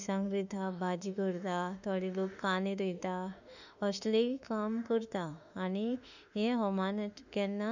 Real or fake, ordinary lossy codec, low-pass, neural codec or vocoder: fake; none; 7.2 kHz; autoencoder, 48 kHz, 32 numbers a frame, DAC-VAE, trained on Japanese speech